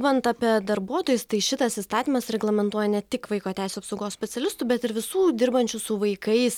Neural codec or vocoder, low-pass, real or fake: none; 19.8 kHz; real